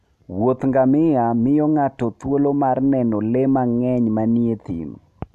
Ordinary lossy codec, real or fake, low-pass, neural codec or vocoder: none; real; 14.4 kHz; none